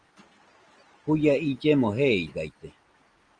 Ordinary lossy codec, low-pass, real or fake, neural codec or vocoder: Opus, 24 kbps; 9.9 kHz; real; none